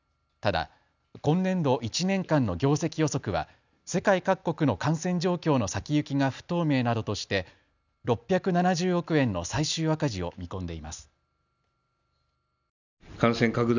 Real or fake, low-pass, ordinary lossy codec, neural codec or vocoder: real; 7.2 kHz; none; none